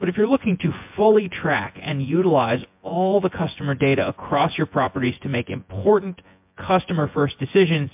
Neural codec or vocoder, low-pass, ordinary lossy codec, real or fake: vocoder, 24 kHz, 100 mel bands, Vocos; 3.6 kHz; MP3, 32 kbps; fake